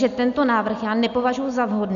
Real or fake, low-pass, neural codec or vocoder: real; 7.2 kHz; none